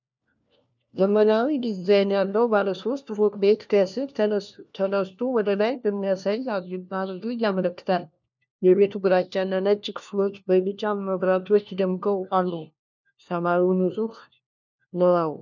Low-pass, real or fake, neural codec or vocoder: 7.2 kHz; fake; codec, 16 kHz, 1 kbps, FunCodec, trained on LibriTTS, 50 frames a second